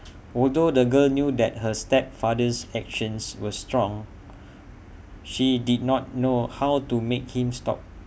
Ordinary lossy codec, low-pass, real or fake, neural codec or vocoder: none; none; real; none